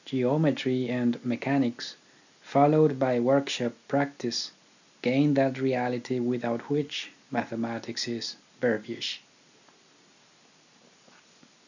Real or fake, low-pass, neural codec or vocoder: real; 7.2 kHz; none